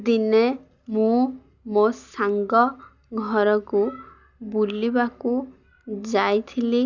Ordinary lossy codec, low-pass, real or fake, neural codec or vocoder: none; 7.2 kHz; real; none